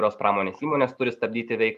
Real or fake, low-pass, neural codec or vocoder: real; 14.4 kHz; none